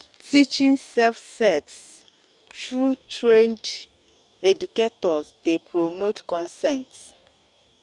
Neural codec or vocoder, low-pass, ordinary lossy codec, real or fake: codec, 44.1 kHz, 2.6 kbps, DAC; 10.8 kHz; none; fake